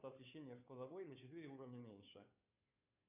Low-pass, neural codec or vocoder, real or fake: 3.6 kHz; codec, 16 kHz, 2 kbps, FunCodec, trained on Chinese and English, 25 frames a second; fake